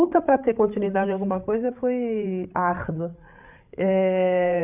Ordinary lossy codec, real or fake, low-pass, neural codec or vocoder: none; fake; 3.6 kHz; codec, 16 kHz, 8 kbps, FreqCodec, larger model